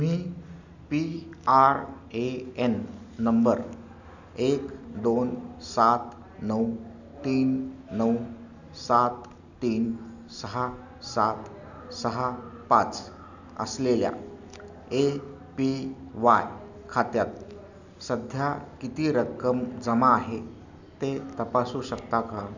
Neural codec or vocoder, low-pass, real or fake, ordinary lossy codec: none; 7.2 kHz; real; none